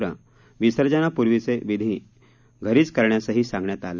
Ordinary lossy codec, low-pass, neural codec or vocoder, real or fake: none; 7.2 kHz; none; real